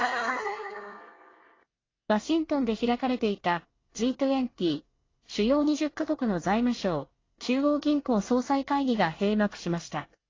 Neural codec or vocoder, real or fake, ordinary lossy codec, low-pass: codec, 24 kHz, 1 kbps, SNAC; fake; AAC, 32 kbps; 7.2 kHz